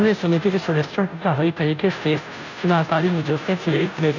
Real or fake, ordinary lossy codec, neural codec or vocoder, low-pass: fake; none; codec, 16 kHz, 0.5 kbps, FunCodec, trained on Chinese and English, 25 frames a second; 7.2 kHz